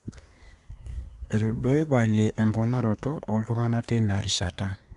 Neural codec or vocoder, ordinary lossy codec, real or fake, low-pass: codec, 24 kHz, 1 kbps, SNAC; none; fake; 10.8 kHz